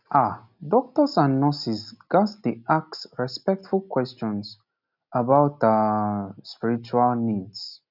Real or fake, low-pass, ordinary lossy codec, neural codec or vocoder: real; 5.4 kHz; none; none